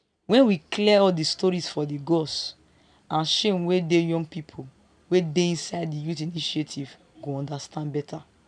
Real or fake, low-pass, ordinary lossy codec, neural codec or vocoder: real; 9.9 kHz; none; none